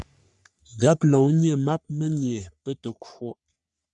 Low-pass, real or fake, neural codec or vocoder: 10.8 kHz; fake; codec, 44.1 kHz, 3.4 kbps, Pupu-Codec